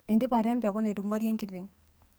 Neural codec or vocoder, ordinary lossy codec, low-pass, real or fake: codec, 44.1 kHz, 2.6 kbps, SNAC; none; none; fake